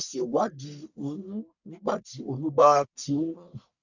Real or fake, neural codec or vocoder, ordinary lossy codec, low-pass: fake; codec, 44.1 kHz, 1.7 kbps, Pupu-Codec; none; 7.2 kHz